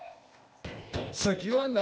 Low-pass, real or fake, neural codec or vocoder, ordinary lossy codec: none; fake; codec, 16 kHz, 0.8 kbps, ZipCodec; none